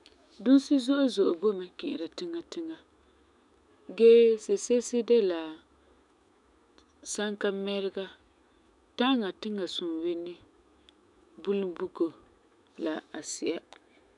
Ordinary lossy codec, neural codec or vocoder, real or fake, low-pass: none; autoencoder, 48 kHz, 128 numbers a frame, DAC-VAE, trained on Japanese speech; fake; 10.8 kHz